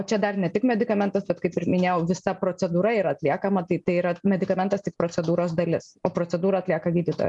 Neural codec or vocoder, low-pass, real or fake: none; 10.8 kHz; real